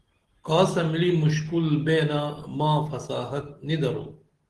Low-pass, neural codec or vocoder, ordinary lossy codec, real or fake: 10.8 kHz; none; Opus, 16 kbps; real